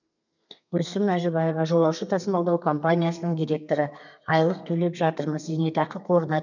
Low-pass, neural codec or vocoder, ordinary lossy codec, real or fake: 7.2 kHz; codec, 32 kHz, 1.9 kbps, SNAC; none; fake